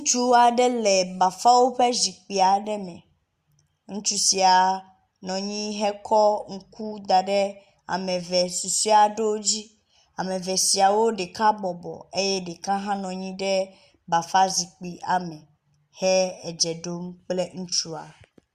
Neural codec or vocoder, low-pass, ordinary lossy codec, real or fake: none; 14.4 kHz; Opus, 64 kbps; real